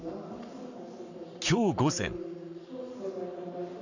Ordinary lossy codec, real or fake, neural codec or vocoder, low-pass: none; fake; codec, 44.1 kHz, 7.8 kbps, Pupu-Codec; 7.2 kHz